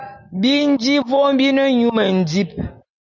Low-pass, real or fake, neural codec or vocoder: 7.2 kHz; real; none